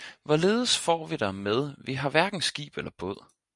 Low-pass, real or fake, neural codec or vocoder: 10.8 kHz; real; none